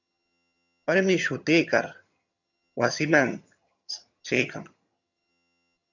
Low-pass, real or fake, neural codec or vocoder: 7.2 kHz; fake; vocoder, 22.05 kHz, 80 mel bands, HiFi-GAN